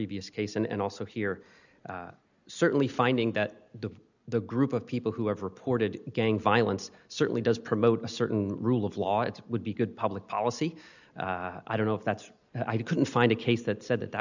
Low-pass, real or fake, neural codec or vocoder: 7.2 kHz; real; none